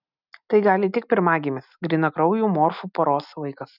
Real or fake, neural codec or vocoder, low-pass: real; none; 5.4 kHz